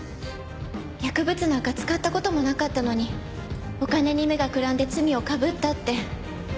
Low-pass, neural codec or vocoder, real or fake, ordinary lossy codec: none; none; real; none